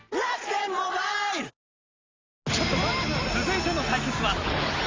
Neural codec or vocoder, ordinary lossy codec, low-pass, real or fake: none; Opus, 32 kbps; 7.2 kHz; real